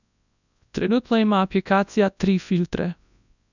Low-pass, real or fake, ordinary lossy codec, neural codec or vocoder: 7.2 kHz; fake; none; codec, 24 kHz, 0.9 kbps, WavTokenizer, large speech release